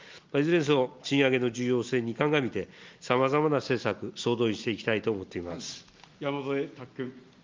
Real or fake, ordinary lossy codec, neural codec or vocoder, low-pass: real; Opus, 24 kbps; none; 7.2 kHz